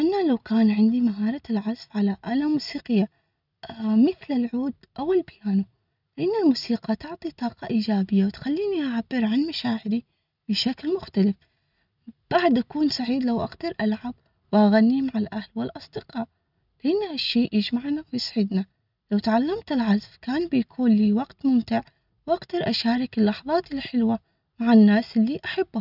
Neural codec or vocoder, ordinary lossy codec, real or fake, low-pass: none; none; real; 5.4 kHz